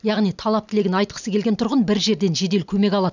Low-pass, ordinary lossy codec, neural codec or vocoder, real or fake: 7.2 kHz; none; none; real